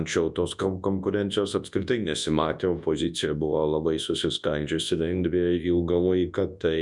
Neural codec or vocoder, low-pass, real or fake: codec, 24 kHz, 0.9 kbps, WavTokenizer, large speech release; 10.8 kHz; fake